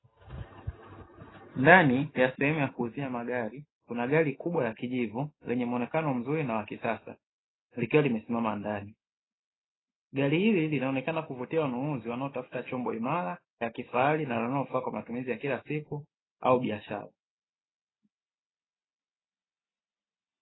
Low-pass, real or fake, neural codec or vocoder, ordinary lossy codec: 7.2 kHz; real; none; AAC, 16 kbps